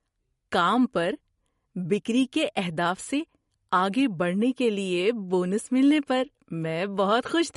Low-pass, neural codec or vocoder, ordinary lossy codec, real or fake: 19.8 kHz; none; MP3, 48 kbps; real